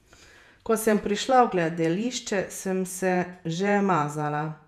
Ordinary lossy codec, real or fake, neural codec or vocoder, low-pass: none; fake; vocoder, 48 kHz, 128 mel bands, Vocos; 14.4 kHz